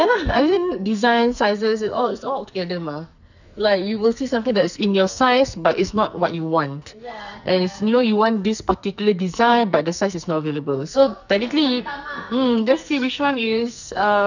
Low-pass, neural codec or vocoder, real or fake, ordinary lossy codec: 7.2 kHz; codec, 44.1 kHz, 2.6 kbps, SNAC; fake; none